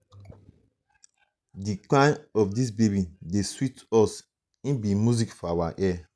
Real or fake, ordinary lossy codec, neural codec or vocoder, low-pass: real; none; none; none